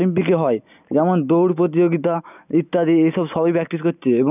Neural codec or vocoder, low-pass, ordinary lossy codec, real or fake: none; 3.6 kHz; none; real